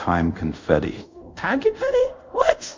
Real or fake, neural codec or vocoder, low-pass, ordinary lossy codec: fake; codec, 24 kHz, 0.5 kbps, DualCodec; 7.2 kHz; MP3, 64 kbps